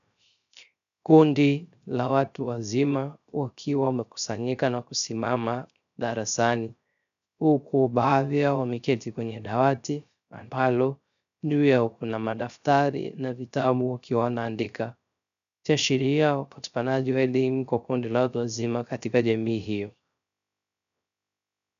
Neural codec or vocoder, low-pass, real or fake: codec, 16 kHz, 0.3 kbps, FocalCodec; 7.2 kHz; fake